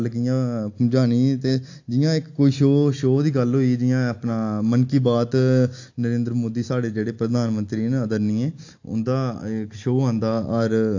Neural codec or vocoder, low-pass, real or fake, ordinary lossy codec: none; 7.2 kHz; real; AAC, 48 kbps